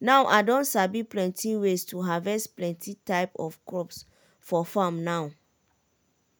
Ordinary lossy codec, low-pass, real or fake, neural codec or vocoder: none; none; real; none